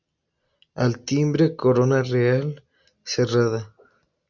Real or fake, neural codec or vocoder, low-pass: real; none; 7.2 kHz